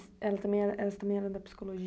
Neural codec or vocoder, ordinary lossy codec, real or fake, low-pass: none; none; real; none